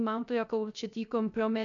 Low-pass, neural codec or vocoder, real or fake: 7.2 kHz; codec, 16 kHz, 0.3 kbps, FocalCodec; fake